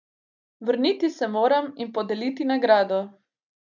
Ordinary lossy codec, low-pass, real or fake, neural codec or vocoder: none; 7.2 kHz; real; none